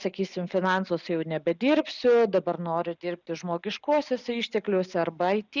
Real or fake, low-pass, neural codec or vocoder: real; 7.2 kHz; none